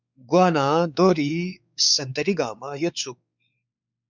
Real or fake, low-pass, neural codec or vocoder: fake; 7.2 kHz; codec, 16 kHz, 4 kbps, X-Codec, WavLM features, trained on Multilingual LibriSpeech